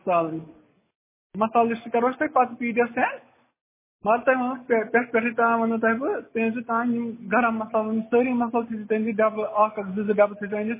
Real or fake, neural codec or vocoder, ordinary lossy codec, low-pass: real; none; MP3, 16 kbps; 3.6 kHz